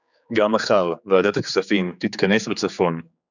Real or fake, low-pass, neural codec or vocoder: fake; 7.2 kHz; codec, 16 kHz, 4 kbps, X-Codec, HuBERT features, trained on general audio